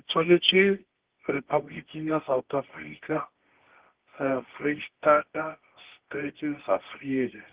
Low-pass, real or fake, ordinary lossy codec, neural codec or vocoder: 3.6 kHz; fake; Opus, 16 kbps; codec, 24 kHz, 0.9 kbps, WavTokenizer, medium music audio release